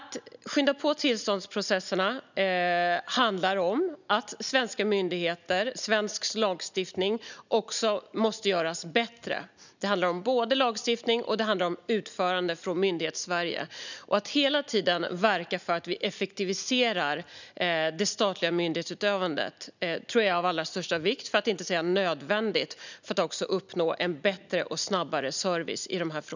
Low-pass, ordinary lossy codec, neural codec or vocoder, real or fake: 7.2 kHz; none; none; real